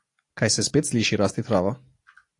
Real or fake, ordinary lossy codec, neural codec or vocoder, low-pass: real; AAC, 48 kbps; none; 10.8 kHz